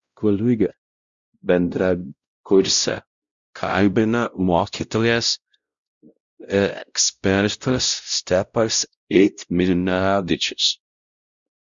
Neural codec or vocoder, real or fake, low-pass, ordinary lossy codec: codec, 16 kHz, 0.5 kbps, X-Codec, WavLM features, trained on Multilingual LibriSpeech; fake; 7.2 kHz; Opus, 64 kbps